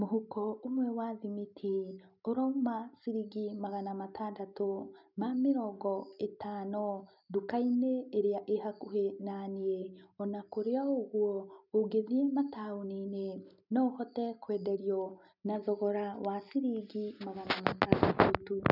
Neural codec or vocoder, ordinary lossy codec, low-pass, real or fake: none; none; 5.4 kHz; real